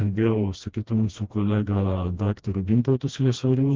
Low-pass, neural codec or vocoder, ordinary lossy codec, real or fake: 7.2 kHz; codec, 16 kHz, 1 kbps, FreqCodec, smaller model; Opus, 16 kbps; fake